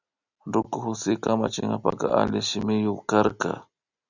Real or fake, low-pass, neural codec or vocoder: real; 7.2 kHz; none